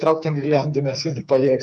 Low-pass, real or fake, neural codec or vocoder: 10.8 kHz; fake; codec, 44.1 kHz, 2.6 kbps, SNAC